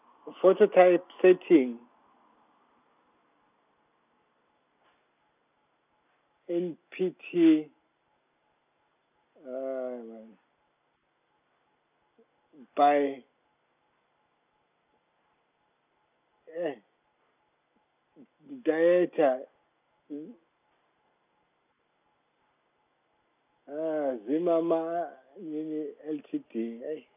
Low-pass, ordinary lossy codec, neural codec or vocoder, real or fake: 3.6 kHz; none; none; real